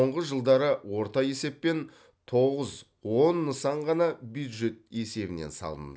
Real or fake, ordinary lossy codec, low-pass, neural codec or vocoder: real; none; none; none